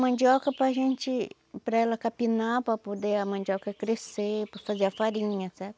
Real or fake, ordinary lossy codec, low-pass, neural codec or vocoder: real; none; none; none